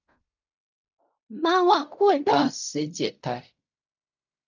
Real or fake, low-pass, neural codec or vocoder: fake; 7.2 kHz; codec, 16 kHz in and 24 kHz out, 0.4 kbps, LongCat-Audio-Codec, fine tuned four codebook decoder